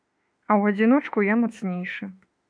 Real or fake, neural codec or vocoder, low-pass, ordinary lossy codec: fake; autoencoder, 48 kHz, 32 numbers a frame, DAC-VAE, trained on Japanese speech; 9.9 kHz; MP3, 64 kbps